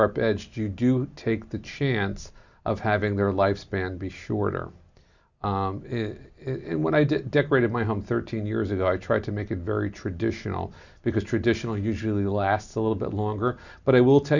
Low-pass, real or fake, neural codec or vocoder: 7.2 kHz; real; none